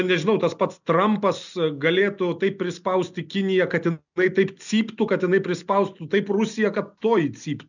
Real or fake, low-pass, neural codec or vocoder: real; 7.2 kHz; none